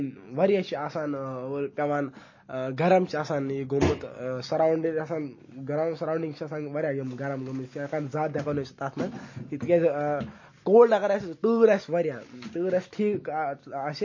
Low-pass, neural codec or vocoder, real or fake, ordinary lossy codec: 7.2 kHz; none; real; MP3, 32 kbps